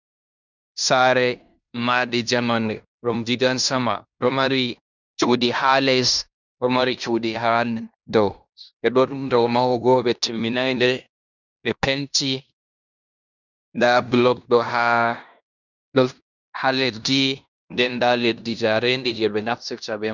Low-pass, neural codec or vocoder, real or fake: 7.2 kHz; codec, 16 kHz in and 24 kHz out, 0.9 kbps, LongCat-Audio-Codec, fine tuned four codebook decoder; fake